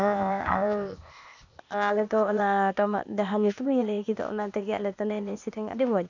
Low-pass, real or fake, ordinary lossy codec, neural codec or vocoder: 7.2 kHz; fake; none; codec, 16 kHz, 0.8 kbps, ZipCodec